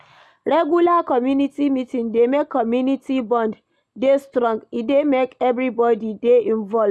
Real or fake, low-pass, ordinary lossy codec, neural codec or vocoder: real; none; none; none